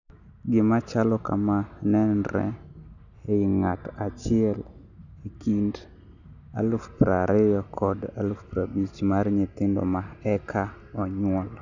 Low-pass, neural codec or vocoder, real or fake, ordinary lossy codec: 7.2 kHz; none; real; none